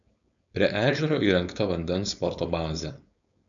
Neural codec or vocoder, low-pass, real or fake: codec, 16 kHz, 4.8 kbps, FACodec; 7.2 kHz; fake